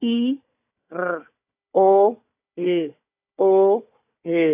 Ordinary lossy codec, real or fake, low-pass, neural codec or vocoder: AAC, 24 kbps; fake; 3.6 kHz; codec, 16 kHz, 4 kbps, FunCodec, trained on Chinese and English, 50 frames a second